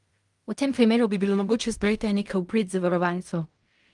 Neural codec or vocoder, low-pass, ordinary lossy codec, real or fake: codec, 16 kHz in and 24 kHz out, 0.4 kbps, LongCat-Audio-Codec, fine tuned four codebook decoder; 10.8 kHz; Opus, 32 kbps; fake